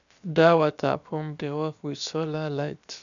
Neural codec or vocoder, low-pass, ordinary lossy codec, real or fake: codec, 16 kHz, about 1 kbps, DyCAST, with the encoder's durations; 7.2 kHz; none; fake